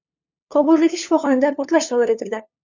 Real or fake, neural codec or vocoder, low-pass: fake; codec, 16 kHz, 2 kbps, FunCodec, trained on LibriTTS, 25 frames a second; 7.2 kHz